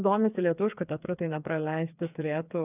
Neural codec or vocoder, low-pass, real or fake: codec, 16 kHz, 4 kbps, FreqCodec, smaller model; 3.6 kHz; fake